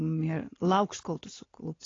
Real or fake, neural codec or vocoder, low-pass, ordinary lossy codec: real; none; 7.2 kHz; AAC, 32 kbps